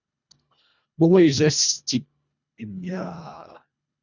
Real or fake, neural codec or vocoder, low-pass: fake; codec, 24 kHz, 1.5 kbps, HILCodec; 7.2 kHz